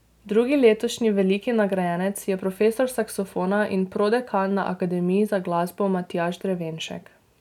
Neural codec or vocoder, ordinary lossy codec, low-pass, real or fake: none; none; 19.8 kHz; real